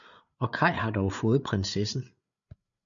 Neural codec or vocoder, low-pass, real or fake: codec, 16 kHz, 8 kbps, FreqCodec, larger model; 7.2 kHz; fake